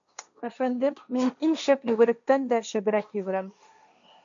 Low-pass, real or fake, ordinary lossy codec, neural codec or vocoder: 7.2 kHz; fake; MP3, 64 kbps; codec, 16 kHz, 1.1 kbps, Voila-Tokenizer